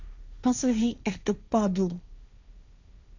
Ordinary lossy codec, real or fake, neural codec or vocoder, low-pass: none; fake; codec, 16 kHz, 1.1 kbps, Voila-Tokenizer; 7.2 kHz